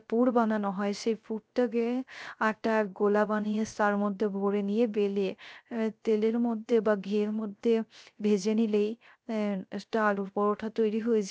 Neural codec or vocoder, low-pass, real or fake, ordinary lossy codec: codec, 16 kHz, 0.3 kbps, FocalCodec; none; fake; none